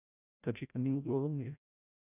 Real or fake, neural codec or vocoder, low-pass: fake; codec, 16 kHz, 0.5 kbps, FreqCodec, larger model; 3.6 kHz